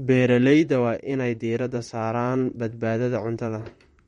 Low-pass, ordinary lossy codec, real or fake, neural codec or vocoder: 19.8 kHz; MP3, 48 kbps; fake; vocoder, 44.1 kHz, 128 mel bands, Pupu-Vocoder